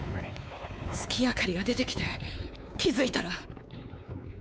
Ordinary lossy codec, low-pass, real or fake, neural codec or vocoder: none; none; fake; codec, 16 kHz, 4 kbps, X-Codec, WavLM features, trained on Multilingual LibriSpeech